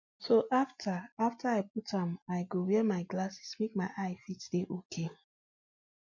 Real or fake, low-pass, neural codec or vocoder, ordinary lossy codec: real; 7.2 kHz; none; MP3, 48 kbps